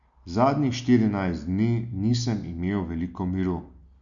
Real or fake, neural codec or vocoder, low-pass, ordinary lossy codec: real; none; 7.2 kHz; none